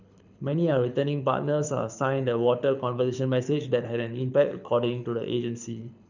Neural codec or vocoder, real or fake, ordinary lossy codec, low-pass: codec, 24 kHz, 6 kbps, HILCodec; fake; none; 7.2 kHz